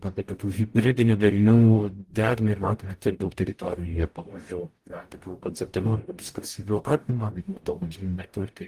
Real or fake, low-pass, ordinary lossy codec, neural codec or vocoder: fake; 14.4 kHz; Opus, 24 kbps; codec, 44.1 kHz, 0.9 kbps, DAC